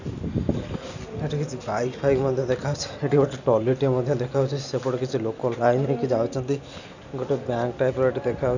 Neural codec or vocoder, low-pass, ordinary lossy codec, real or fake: none; 7.2 kHz; none; real